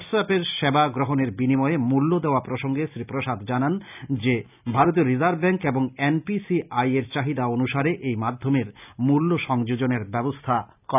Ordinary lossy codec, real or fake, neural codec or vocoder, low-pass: none; real; none; 3.6 kHz